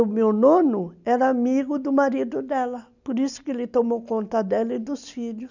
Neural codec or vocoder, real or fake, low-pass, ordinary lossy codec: none; real; 7.2 kHz; none